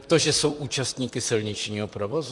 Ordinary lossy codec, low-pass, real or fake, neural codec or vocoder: Opus, 64 kbps; 10.8 kHz; fake; vocoder, 44.1 kHz, 128 mel bands, Pupu-Vocoder